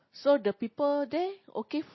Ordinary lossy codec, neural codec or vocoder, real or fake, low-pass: MP3, 24 kbps; none; real; 7.2 kHz